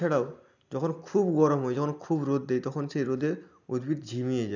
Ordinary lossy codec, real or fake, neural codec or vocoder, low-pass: none; real; none; 7.2 kHz